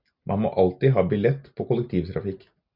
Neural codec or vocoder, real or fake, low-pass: none; real; 5.4 kHz